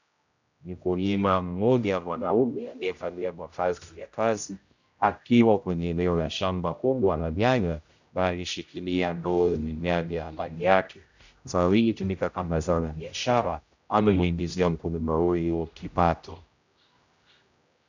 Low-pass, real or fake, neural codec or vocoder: 7.2 kHz; fake; codec, 16 kHz, 0.5 kbps, X-Codec, HuBERT features, trained on general audio